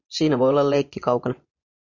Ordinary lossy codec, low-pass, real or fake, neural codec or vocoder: MP3, 64 kbps; 7.2 kHz; fake; vocoder, 24 kHz, 100 mel bands, Vocos